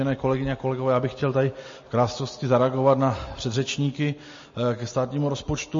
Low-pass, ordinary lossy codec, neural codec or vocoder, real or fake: 7.2 kHz; MP3, 32 kbps; none; real